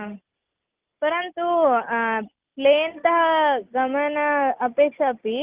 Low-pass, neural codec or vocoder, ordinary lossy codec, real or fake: 3.6 kHz; none; Opus, 24 kbps; real